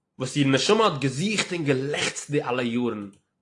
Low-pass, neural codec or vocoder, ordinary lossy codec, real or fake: 10.8 kHz; none; AAC, 48 kbps; real